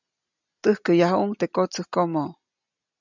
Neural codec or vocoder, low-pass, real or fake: none; 7.2 kHz; real